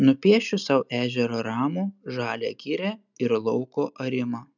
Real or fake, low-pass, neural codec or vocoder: real; 7.2 kHz; none